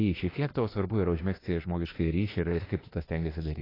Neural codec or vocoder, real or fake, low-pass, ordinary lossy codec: autoencoder, 48 kHz, 32 numbers a frame, DAC-VAE, trained on Japanese speech; fake; 5.4 kHz; AAC, 24 kbps